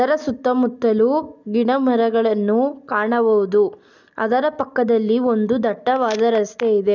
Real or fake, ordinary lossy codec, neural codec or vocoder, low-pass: real; none; none; 7.2 kHz